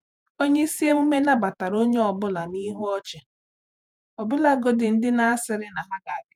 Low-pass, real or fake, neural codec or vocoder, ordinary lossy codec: 19.8 kHz; fake; vocoder, 48 kHz, 128 mel bands, Vocos; none